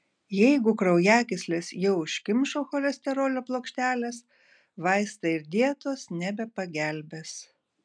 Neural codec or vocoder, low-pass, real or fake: none; 9.9 kHz; real